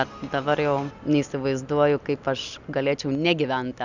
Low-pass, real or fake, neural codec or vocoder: 7.2 kHz; real; none